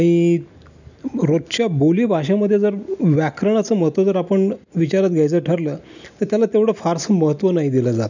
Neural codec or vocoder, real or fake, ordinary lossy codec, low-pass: none; real; none; 7.2 kHz